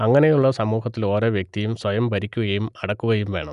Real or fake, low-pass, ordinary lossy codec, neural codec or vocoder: real; 10.8 kHz; none; none